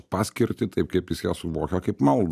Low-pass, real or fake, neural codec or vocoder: 14.4 kHz; real; none